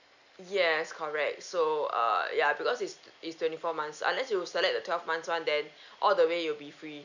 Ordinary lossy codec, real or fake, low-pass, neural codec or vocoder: none; real; 7.2 kHz; none